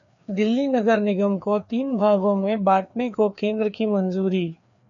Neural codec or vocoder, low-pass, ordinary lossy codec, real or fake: codec, 16 kHz, 2 kbps, FreqCodec, larger model; 7.2 kHz; MP3, 64 kbps; fake